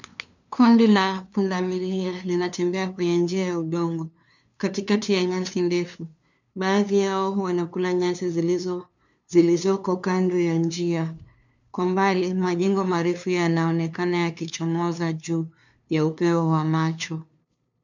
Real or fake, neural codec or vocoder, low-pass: fake; codec, 16 kHz, 2 kbps, FunCodec, trained on LibriTTS, 25 frames a second; 7.2 kHz